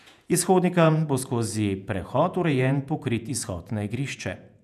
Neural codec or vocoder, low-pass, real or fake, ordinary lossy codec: vocoder, 48 kHz, 128 mel bands, Vocos; 14.4 kHz; fake; none